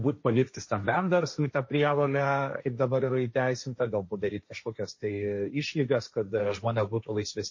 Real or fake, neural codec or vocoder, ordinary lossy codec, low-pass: fake; codec, 16 kHz, 1.1 kbps, Voila-Tokenizer; MP3, 32 kbps; 7.2 kHz